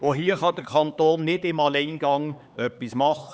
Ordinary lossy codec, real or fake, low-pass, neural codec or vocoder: none; fake; none; codec, 16 kHz, 4 kbps, X-Codec, HuBERT features, trained on LibriSpeech